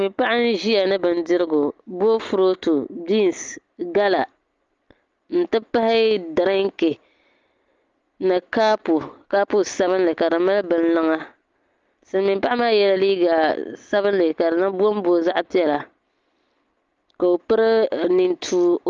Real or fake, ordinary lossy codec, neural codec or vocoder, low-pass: real; Opus, 32 kbps; none; 7.2 kHz